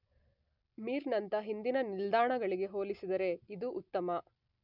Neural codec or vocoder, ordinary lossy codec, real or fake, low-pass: none; none; real; 5.4 kHz